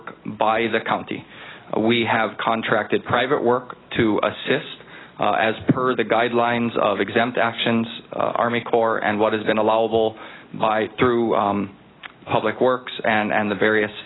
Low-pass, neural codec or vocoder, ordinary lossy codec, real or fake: 7.2 kHz; none; AAC, 16 kbps; real